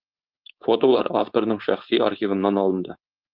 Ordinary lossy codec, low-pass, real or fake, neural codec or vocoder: Opus, 32 kbps; 5.4 kHz; fake; codec, 16 kHz, 4.8 kbps, FACodec